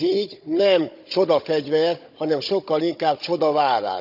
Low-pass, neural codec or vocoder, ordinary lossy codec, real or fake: 5.4 kHz; codec, 16 kHz, 16 kbps, FunCodec, trained on Chinese and English, 50 frames a second; none; fake